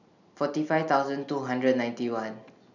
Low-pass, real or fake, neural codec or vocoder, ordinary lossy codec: 7.2 kHz; real; none; none